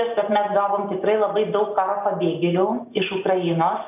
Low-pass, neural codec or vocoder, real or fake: 3.6 kHz; none; real